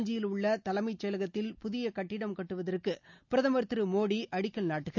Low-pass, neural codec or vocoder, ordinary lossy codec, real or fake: 7.2 kHz; none; none; real